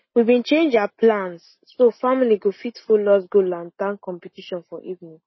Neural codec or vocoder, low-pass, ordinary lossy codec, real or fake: none; 7.2 kHz; MP3, 24 kbps; real